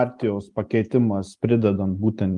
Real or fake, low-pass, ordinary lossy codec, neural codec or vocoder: real; 10.8 kHz; Opus, 32 kbps; none